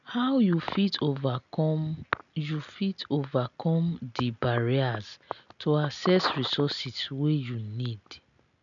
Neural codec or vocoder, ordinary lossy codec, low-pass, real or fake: none; none; 7.2 kHz; real